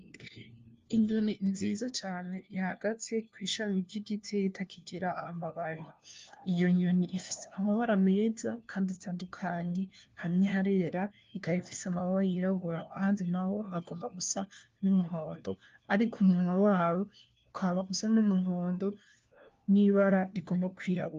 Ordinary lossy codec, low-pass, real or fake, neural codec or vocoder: Opus, 32 kbps; 7.2 kHz; fake; codec, 16 kHz, 1 kbps, FunCodec, trained on LibriTTS, 50 frames a second